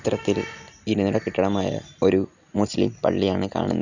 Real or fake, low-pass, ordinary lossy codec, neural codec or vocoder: real; 7.2 kHz; none; none